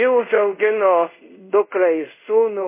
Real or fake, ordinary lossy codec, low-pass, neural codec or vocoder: fake; MP3, 24 kbps; 3.6 kHz; codec, 24 kHz, 0.5 kbps, DualCodec